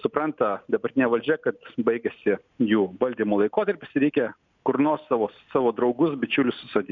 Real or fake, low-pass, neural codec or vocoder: real; 7.2 kHz; none